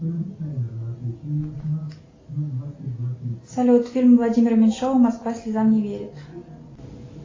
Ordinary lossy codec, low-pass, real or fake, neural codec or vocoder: AAC, 32 kbps; 7.2 kHz; real; none